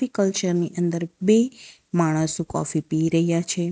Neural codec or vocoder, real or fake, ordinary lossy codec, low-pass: none; real; none; none